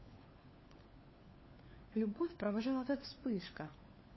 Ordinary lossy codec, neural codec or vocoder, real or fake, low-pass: MP3, 24 kbps; codec, 16 kHz, 4 kbps, FunCodec, trained on LibriTTS, 50 frames a second; fake; 7.2 kHz